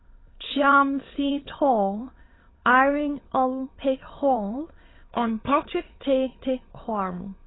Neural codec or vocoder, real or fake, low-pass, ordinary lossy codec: autoencoder, 22.05 kHz, a latent of 192 numbers a frame, VITS, trained on many speakers; fake; 7.2 kHz; AAC, 16 kbps